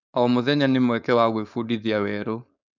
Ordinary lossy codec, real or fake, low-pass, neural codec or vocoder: none; fake; 7.2 kHz; codec, 16 kHz, 4.8 kbps, FACodec